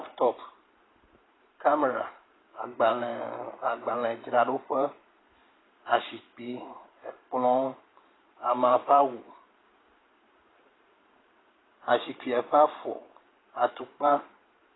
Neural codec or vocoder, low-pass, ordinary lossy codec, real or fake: vocoder, 44.1 kHz, 128 mel bands every 512 samples, BigVGAN v2; 7.2 kHz; AAC, 16 kbps; fake